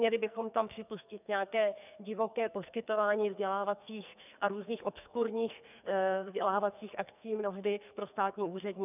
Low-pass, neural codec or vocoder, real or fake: 3.6 kHz; codec, 44.1 kHz, 2.6 kbps, SNAC; fake